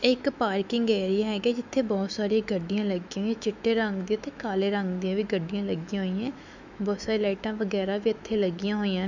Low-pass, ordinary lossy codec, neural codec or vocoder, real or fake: 7.2 kHz; none; autoencoder, 48 kHz, 128 numbers a frame, DAC-VAE, trained on Japanese speech; fake